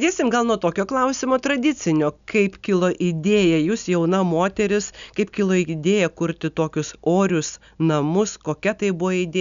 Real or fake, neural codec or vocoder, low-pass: real; none; 7.2 kHz